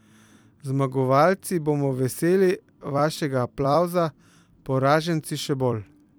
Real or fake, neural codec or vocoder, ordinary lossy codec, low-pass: fake; vocoder, 44.1 kHz, 128 mel bands every 256 samples, BigVGAN v2; none; none